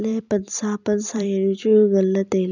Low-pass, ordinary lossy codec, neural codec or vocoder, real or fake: 7.2 kHz; none; vocoder, 44.1 kHz, 128 mel bands every 256 samples, BigVGAN v2; fake